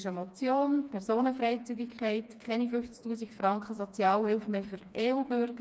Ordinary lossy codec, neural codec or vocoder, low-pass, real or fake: none; codec, 16 kHz, 2 kbps, FreqCodec, smaller model; none; fake